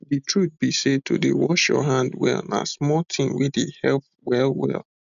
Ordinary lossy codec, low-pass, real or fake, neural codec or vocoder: none; 7.2 kHz; real; none